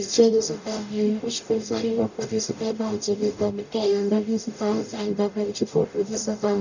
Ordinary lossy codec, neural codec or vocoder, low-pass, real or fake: none; codec, 44.1 kHz, 0.9 kbps, DAC; 7.2 kHz; fake